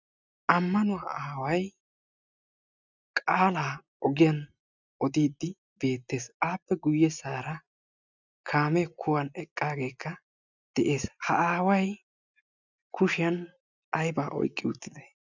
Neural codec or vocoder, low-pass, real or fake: none; 7.2 kHz; real